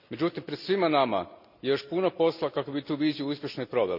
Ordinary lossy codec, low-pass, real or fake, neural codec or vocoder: none; 5.4 kHz; real; none